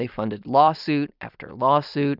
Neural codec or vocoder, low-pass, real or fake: vocoder, 44.1 kHz, 128 mel bands every 256 samples, BigVGAN v2; 5.4 kHz; fake